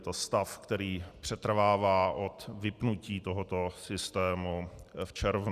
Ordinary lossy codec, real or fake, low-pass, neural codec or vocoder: Opus, 64 kbps; real; 14.4 kHz; none